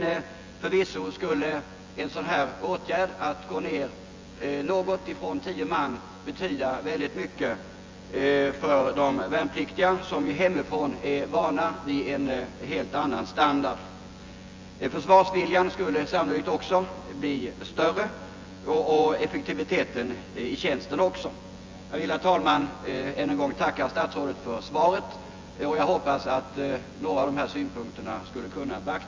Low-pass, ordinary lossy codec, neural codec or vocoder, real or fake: 7.2 kHz; Opus, 32 kbps; vocoder, 24 kHz, 100 mel bands, Vocos; fake